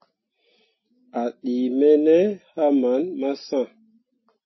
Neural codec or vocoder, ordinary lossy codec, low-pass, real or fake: none; MP3, 24 kbps; 7.2 kHz; real